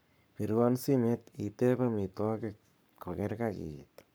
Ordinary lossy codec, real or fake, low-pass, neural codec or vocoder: none; fake; none; codec, 44.1 kHz, 7.8 kbps, Pupu-Codec